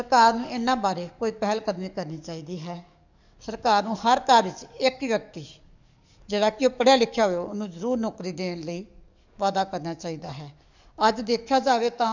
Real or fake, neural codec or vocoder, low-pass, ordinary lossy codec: fake; codec, 44.1 kHz, 7.8 kbps, DAC; 7.2 kHz; none